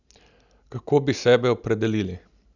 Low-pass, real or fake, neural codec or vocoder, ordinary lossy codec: 7.2 kHz; real; none; none